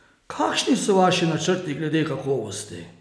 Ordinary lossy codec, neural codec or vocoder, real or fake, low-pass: none; none; real; none